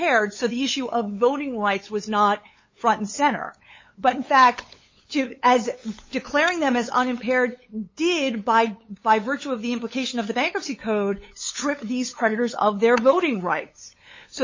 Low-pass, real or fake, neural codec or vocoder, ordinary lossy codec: 7.2 kHz; fake; codec, 16 kHz, 8 kbps, FunCodec, trained on LibriTTS, 25 frames a second; MP3, 32 kbps